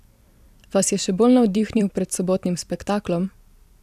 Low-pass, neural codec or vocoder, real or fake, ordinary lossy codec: 14.4 kHz; vocoder, 44.1 kHz, 128 mel bands every 256 samples, BigVGAN v2; fake; AAC, 96 kbps